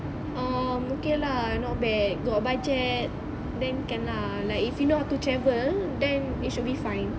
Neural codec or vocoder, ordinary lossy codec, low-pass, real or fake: none; none; none; real